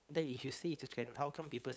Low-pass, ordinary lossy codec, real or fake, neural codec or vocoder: none; none; fake; codec, 16 kHz, 8 kbps, FunCodec, trained on LibriTTS, 25 frames a second